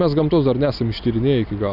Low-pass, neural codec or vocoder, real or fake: 5.4 kHz; none; real